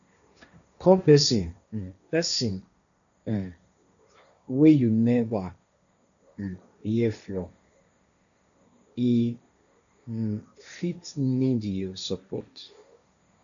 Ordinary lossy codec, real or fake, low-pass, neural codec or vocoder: none; fake; 7.2 kHz; codec, 16 kHz, 1.1 kbps, Voila-Tokenizer